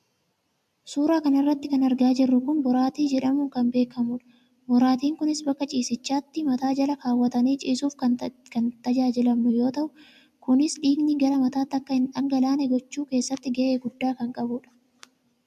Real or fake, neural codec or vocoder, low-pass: real; none; 14.4 kHz